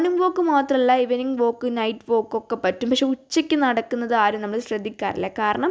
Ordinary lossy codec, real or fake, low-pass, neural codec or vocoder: none; real; none; none